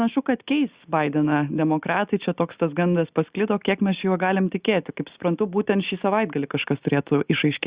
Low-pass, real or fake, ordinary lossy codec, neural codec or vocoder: 3.6 kHz; real; Opus, 64 kbps; none